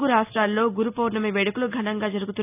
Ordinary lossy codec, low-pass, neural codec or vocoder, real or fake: none; 3.6 kHz; none; real